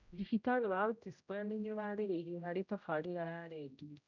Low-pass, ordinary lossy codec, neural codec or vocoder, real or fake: 7.2 kHz; none; codec, 16 kHz, 0.5 kbps, X-Codec, HuBERT features, trained on general audio; fake